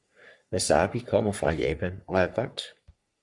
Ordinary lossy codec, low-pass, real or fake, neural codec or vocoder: Opus, 64 kbps; 10.8 kHz; fake; codec, 44.1 kHz, 3.4 kbps, Pupu-Codec